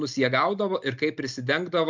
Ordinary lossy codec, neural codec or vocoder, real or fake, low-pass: MP3, 64 kbps; none; real; 7.2 kHz